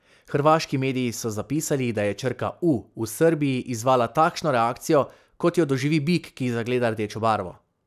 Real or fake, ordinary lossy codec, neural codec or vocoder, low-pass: real; none; none; 14.4 kHz